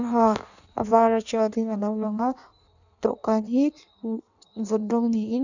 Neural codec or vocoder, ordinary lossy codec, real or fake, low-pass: codec, 16 kHz in and 24 kHz out, 1.1 kbps, FireRedTTS-2 codec; none; fake; 7.2 kHz